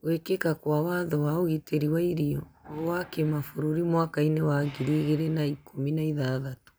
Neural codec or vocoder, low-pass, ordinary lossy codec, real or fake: none; none; none; real